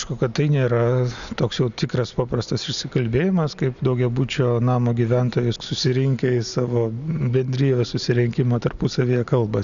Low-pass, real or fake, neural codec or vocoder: 7.2 kHz; real; none